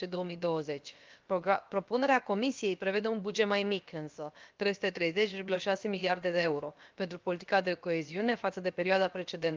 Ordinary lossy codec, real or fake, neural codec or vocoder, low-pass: Opus, 24 kbps; fake; codec, 16 kHz, about 1 kbps, DyCAST, with the encoder's durations; 7.2 kHz